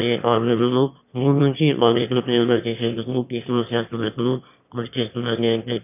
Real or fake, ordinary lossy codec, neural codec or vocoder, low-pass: fake; none; autoencoder, 22.05 kHz, a latent of 192 numbers a frame, VITS, trained on one speaker; 3.6 kHz